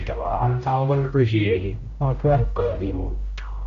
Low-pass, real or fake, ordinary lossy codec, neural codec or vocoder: 7.2 kHz; fake; none; codec, 16 kHz, 0.5 kbps, X-Codec, HuBERT features, trained on general audio